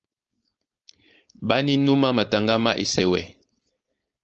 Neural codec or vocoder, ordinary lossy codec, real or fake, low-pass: codec, 16 kHz, 4.8 kbps, FACodec; Opus, 24 kbps; fake; 7.2 kHz